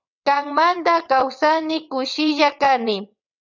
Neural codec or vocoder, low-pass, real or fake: vocoder, 22.05 kHz, 80 mel bands, WaveNeXt; 7.2 kHz; fake